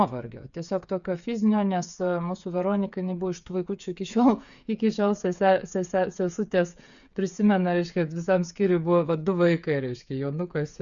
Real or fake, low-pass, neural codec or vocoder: fake; 7.2 kHz; codec, 16 kHz, 8 kbps, FreqCodec, smaller model